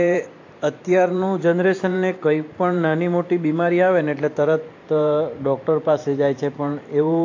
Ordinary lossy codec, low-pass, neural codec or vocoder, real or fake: AAC, 48 kbps; 7.2 kHz; vocoder, 44.1 kHz, 128 mel bands every 512 samples, BigVGAN v2; fake